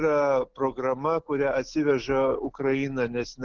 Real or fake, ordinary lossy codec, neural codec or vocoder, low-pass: fake; Opus, 16 kbps; autoencoder, 48 kHz, 128 numbers a frame, DAC-VAE, trained on Japanese speech; 7.2 kHz